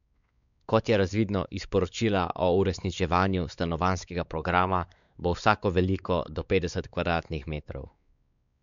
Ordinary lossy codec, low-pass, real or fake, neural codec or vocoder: none; 7.2 kHz; fake; codec, 16 kHz, 4 kbps, X-Codec, WavLM features, trained on Multilingual LibriSpeech